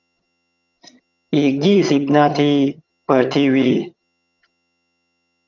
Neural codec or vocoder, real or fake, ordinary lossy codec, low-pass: vocoder, 22.05 kHz, 80 mel bands, HiFi-GAN; fake; none; 7.2 kHz